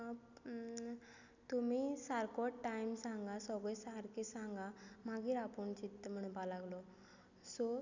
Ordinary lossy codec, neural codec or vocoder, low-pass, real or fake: none; none; 7.2 kHz; real